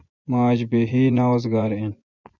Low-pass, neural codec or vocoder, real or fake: 7.2 kHz; vocoder, 24 kHz, 100 mel bands, Vocos; fake